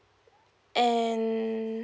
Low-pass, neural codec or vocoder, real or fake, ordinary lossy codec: none; none; real; none